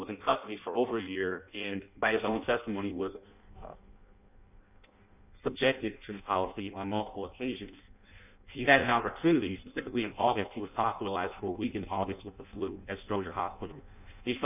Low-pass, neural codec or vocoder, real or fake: 3.6 kHz; codec, 16 kHz in and 24 kHz out, 0.6 kbps, FireRedTTS-2 codec; fake